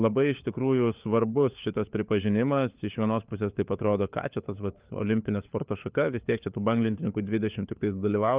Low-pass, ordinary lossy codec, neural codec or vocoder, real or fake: 3.6 kHz; Opus, 24 kbps; codec, 16 kHz, 4 kbps, FunCodec, trained on LibriTTS, 50 frames a second; fake